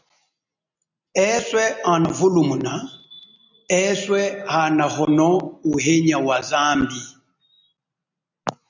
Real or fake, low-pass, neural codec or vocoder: real; 7.2 kHz; none